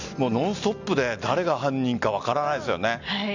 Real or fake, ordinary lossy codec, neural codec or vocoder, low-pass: real; Opus, 64 kbps; none; 7.2 kHz